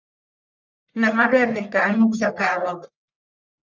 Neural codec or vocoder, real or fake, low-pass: codec, 44.1 kHz, 1.7 kbps, Pupu-Codec; fake; 7.2 kHz